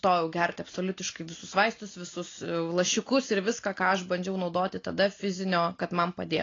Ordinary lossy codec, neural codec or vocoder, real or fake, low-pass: AAC, 32 kbps; none; real; 7.2 kHz